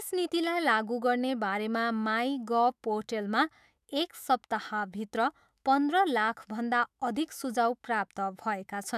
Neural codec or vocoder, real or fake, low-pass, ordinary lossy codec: autoencoder, 48 kHz, 128 numbers a frame, DAC-VAE, trained on Japanese speech; fake; 14.4 kHz; none